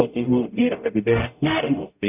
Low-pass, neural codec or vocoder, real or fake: 3.6 kHz; codec, 44.1 kHz, 0.9 kbps, DAC; fake